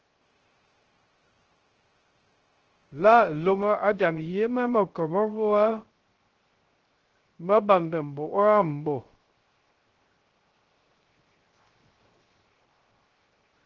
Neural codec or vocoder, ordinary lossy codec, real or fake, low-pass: codec, 16 kHz, 0.3 kbps, FocalCodec; Opus, 16 kbps; fake; 7.2 kHz